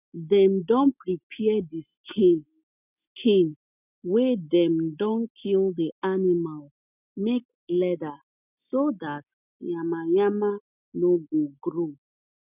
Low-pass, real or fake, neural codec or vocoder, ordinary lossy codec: 3.6 kHz; real; none; none